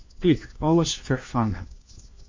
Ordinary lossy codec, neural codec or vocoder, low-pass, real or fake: MP3, 48 kbps; codec, 16 kHz, 1 kbps, FunCodec, trained on LibriTTS, 50 frames a second; 7.2 kHz; fake